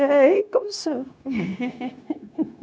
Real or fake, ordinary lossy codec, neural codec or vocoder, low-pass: fake; none; codec, 16 kHz, 0.9 kbps, LongCat-Audio-Codec; none